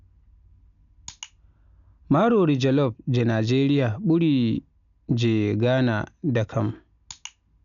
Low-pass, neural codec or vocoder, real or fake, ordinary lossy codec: 7.2 kHz; none; real; none